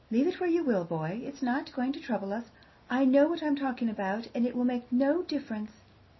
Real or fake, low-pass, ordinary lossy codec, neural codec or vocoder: real; 7.2 kHz; MP3, 24 kbps; none